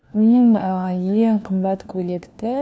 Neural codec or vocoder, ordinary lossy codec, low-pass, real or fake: codec, 16 kHz, 1 kbps, FunCodec, trained on LibriTTS, 50 frames a second; none; none; fake